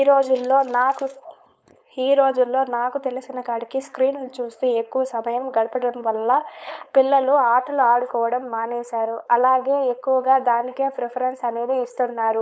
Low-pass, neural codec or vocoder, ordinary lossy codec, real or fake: none; codec, 16 kHz, 4.8 kbps, FACodec; none; fake